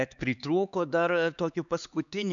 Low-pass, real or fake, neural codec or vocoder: 7.2 kHz; fake; codec, 16 kHz, 4 kbps, X-Codec, HuBERT features, trained on LibriSpeech